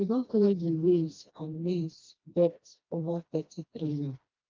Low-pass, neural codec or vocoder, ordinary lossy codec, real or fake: 7.2 kHz; codec, 16 kHz, 1 kbps, FreqCodec, smaller model; Opus, 24 kbps; fake